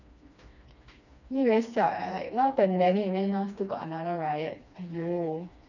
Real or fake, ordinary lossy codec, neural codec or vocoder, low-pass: fake; none; codec, 16 kHz, 2 kbps, FreqCodec, smaller model; 7.2 kHz